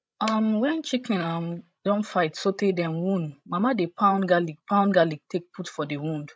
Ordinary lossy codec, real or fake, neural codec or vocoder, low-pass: none; fake; codec, 16 kHz, 16 kbps, FreqCodec, larger model; none